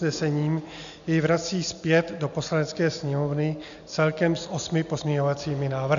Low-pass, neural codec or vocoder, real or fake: 7.2 kHz; none; real